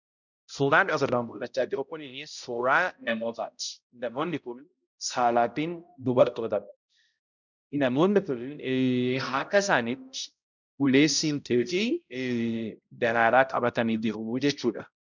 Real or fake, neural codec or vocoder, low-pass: fake; codec, 16 kHz, 0.5 kbps, X-Codec, HuBERT features, trained on balanced general audio; 7.2 kHz